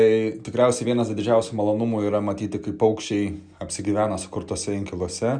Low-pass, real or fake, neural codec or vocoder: 9.9 kHz; real; none